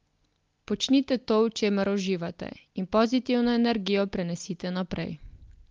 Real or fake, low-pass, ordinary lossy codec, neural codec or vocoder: real; 7.2 kHz; Opus, 32 kbps; none